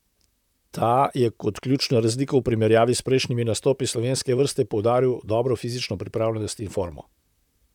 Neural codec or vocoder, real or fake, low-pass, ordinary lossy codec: vocoder, 44.1 kHz, 128 mel bands, Pupu-Vocoder; fake; 19.8 kHz; none